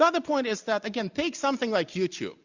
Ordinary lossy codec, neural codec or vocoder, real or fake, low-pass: Opus, 64 kbps; none; real; 7.2 kHz